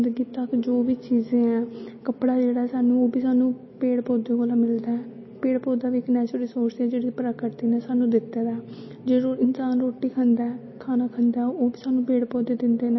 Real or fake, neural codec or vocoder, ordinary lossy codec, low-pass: real; none; MP3, 24 kbps; 7.2 kHz